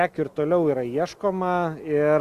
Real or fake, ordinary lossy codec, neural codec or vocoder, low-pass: real; Opus, 64 kbps; none; 14.4 kHz